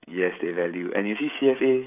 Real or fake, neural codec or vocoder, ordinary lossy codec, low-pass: fake; codec, 16 kHz, 16 kbps, FreqCodec, smaller model; none; 3.6 kHz